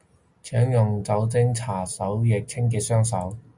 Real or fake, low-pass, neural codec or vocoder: real; 10.8 kHz; none